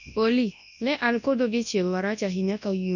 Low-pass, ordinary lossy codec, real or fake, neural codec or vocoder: 7.2 kHz; none; fake; codec, 24 kHz, 0.9 kbps, WavTokenizer, large speech release